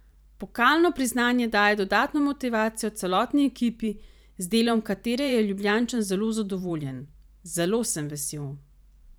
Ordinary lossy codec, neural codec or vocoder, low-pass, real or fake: none; vocoder, 44.1 kHz, 128 mel bands every 512 samples, BigVGAN v2; none; fake